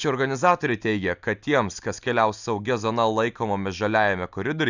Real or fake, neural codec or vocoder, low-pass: real; none; 7.2 kHz